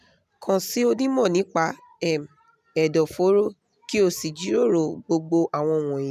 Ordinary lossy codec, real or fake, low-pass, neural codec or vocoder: none; real; 14.4 kHz; none